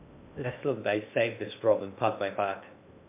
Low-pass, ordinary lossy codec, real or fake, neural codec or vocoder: 3.6 kHz; MP3, 32 kbps; fake; codec, 16 kHz in and 24 kHz out, 0.6 kbps, FocalCodec, streaming, 2048 codes